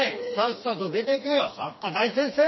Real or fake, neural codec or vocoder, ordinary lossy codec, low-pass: fake; codec, 16 kHz, 2 kbps, FreqCodec, smaller model; MP3, 24 kbps; 7.2 kHz